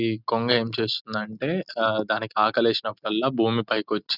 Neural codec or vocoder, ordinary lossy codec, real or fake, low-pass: none; none; real; 5.4 kHz